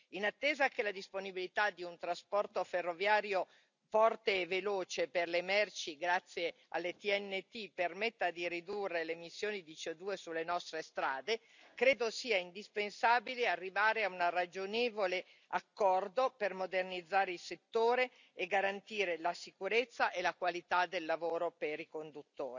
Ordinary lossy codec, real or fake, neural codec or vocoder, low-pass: none; real; none; 7.2 kHz